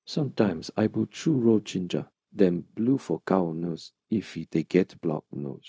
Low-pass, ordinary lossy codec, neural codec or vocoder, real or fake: none; none; codec, 16 kHz, 0.4 kbps, LongCat-Audio-Codec; fake